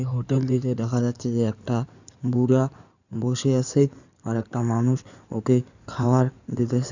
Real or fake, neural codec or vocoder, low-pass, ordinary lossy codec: fake; codec, 16 kHz in and 24 kHz out, 2.2 kbps, FireRedTTS-2 codec; 7.2 kHz; none